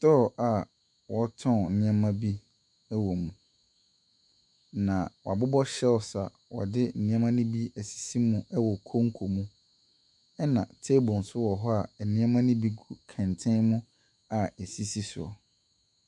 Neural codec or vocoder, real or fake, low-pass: autoencoder, 48 kHz, 128 numbers a frame, DAC-VAE, trained on Japanese speech; fake; 10.8 kHz